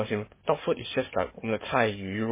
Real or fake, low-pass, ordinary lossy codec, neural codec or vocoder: fake; 3.6 kHz; MP3, 16 kbps; codec, 44.1 kHz, 7.8 kbps, DAC